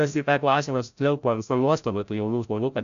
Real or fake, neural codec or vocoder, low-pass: fake; codec, 16 kHz, 0.5 kbps, FreqCodec, larger model; 7.2 kHz